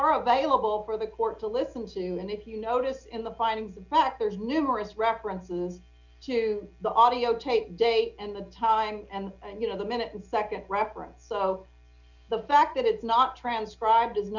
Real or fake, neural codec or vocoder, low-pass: real; none; 7.2 kHz